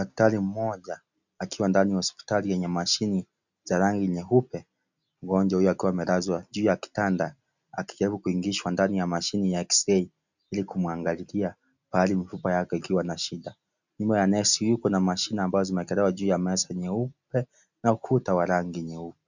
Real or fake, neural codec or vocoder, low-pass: real; none; 7.2 kHz